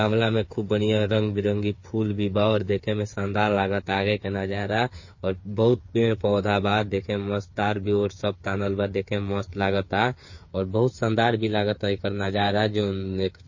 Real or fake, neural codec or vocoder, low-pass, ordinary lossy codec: fake; codec, 16 kHz, 8 kbps, FreqCodec, smaller model; 7.2 kHz; MP3, 32 kbps